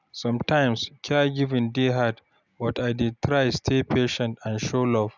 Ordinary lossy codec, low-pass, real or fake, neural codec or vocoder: none; 7.2 kHz; real; none